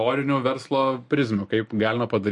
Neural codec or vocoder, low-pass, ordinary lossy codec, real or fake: none; 10.8 kHz; MP3, 48 kbps; real